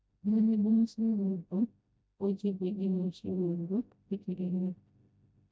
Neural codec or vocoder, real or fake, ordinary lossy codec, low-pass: codec, 16 kHz, 0.5 kbps, FreqCodec, smaller model; fake; none; none